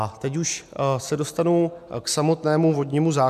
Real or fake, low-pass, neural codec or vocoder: real; 14.4 kHz; none